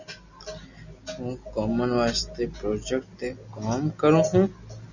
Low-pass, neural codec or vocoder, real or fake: 7.2 kHz; none; real